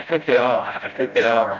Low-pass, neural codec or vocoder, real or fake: 7.2 kHz; codec, 16 kHz, 0.5 kbps, FreqCodec, smaller model; fake